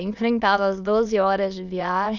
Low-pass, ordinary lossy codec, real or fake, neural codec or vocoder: 7.2 kHz; none; fake; autoencoder, 22.05 kHz, a latent of 192 numbers a frame, VITS, trained on many speakers